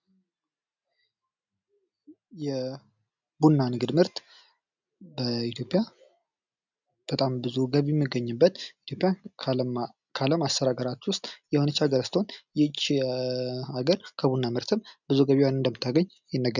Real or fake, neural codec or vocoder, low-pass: real; none; 7.2 kHz